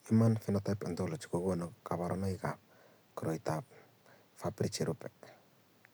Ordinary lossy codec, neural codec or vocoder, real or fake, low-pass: none; none; real; none